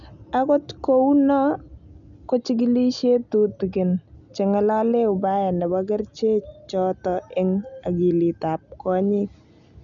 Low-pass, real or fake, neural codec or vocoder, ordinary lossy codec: 7.2 kHz; real; none; none